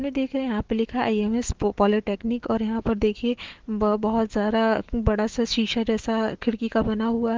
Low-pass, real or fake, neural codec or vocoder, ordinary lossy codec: 7.2 kHz; fake; autoencoder, 48 kHz, 128 numbers a frame, DAC-VAE, trained on Japanese speech; Opus, 16 kbps